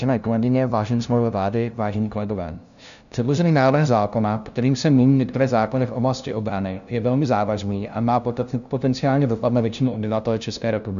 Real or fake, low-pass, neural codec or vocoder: fake; 7.2 kHz; codec, 16 kHz, 0.5 kbps, FunCodec, trained on LibriTTS, 25 frames a second